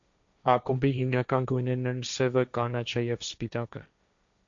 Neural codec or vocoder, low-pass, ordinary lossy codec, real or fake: codec, 16 kHz, 1.1 kbps, Voila-Tokenizer; 7.2 kHz; MP3, 64 kbps; fake